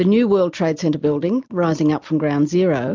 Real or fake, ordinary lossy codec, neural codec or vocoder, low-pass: real; MP3, 64 kbps; none; 7.2 kHz